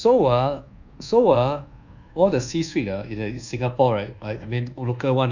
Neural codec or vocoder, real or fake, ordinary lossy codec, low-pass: codec, 24 kHz, 1.2 kbps, DualCodec; fake; none; 7.2 kHz